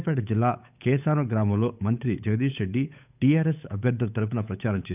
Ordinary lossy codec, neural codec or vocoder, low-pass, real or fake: none; codec, 16 kHz, 8 kbps, FunCodec, trained on Chinese and English, 25 frames a second; 3.6 kHz; fake